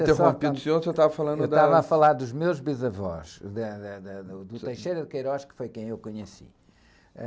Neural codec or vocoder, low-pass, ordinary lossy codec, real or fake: none; none; none; real